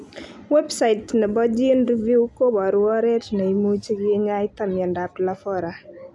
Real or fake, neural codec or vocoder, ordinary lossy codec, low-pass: real; none; none; none